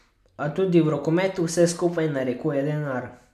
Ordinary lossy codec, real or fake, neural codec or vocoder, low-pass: none; real; none; 14.4 kHz